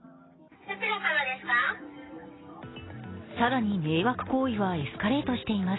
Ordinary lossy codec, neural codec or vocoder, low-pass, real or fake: AAC, 16 kbps; none; 7.2 kHz; real